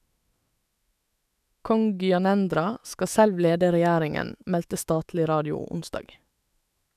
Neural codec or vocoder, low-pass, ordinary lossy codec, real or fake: autoencoder, 48 kHz, 128 numbers a frame, DAC-VAE, trained on Japanese speech; 14.4 kHz; none; fake